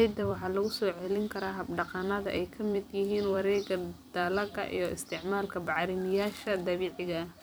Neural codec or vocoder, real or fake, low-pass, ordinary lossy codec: vocoder, 44.1 kHz, 128 mel bands every 256 samples, BigVGAN v2; fake; none; none